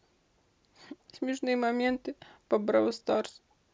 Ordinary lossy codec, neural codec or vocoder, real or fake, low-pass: none; none; real; none